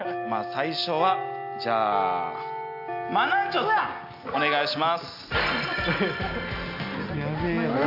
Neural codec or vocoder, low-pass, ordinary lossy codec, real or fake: none; 5.4 kHz; AAC, 48 kbps; real